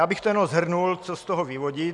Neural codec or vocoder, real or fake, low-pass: none; real; 10.8 kHz